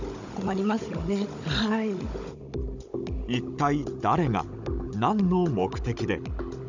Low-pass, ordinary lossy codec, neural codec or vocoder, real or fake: 7.2 kHz; none; codec, 16 kHz, 16 kbps, FunCodec, trained on Chinese and English, 50 frames a second; fake